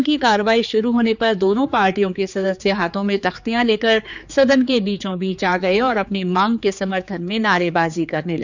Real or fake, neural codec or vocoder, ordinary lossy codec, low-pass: fake; codec, 16 kHz, 4 kbps, X-Codec, HuBERT features, trained on general audio; none; 7.2 kHz